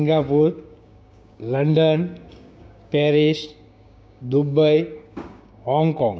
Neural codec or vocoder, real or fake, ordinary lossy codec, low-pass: codec, 16 kHz, 6 kbps, DAC; fake; none; none